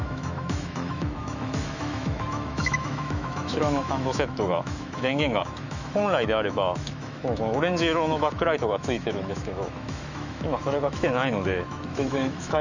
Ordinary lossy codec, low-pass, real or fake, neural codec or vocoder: none; 7.2 kHz; fake; autoencoder, 48 kHz, 128 numbers a frame, DAC-VAE, trained on Japanese speech